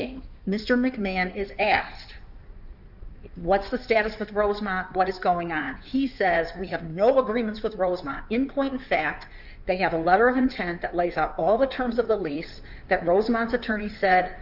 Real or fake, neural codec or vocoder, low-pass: fake; codec, 16 kHz in and 24 kHz out, 2.2 kbps, FireRedTTS-2 codec; 5.4 kHz